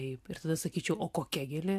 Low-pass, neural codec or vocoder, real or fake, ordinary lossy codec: 14.4 kHz; none; real; MP3, 96 kbps